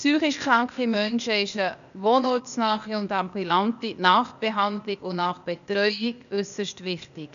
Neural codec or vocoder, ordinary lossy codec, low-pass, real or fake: codec, 16 kHz, 0.8 kbps, ZipCodec; none; 7.2 kHz; fake